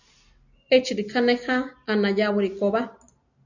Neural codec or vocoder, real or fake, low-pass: none; real; 7.2 kHz